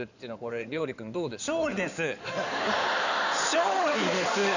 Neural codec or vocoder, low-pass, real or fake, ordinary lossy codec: codec, 16 kHz in and 24 kHz out, 2.2 kbps, FireRedTTS-2 codec; 7.2 kHz; fake; none